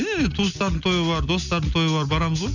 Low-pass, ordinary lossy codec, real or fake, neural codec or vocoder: 7.2 kHz; none; real; none